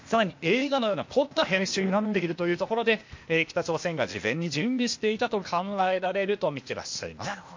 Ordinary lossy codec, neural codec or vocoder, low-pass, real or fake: MP3, 48 kbps; codec, 16 kHz, 0.8 kbps, ZipCodec; 7.2 kHz; fake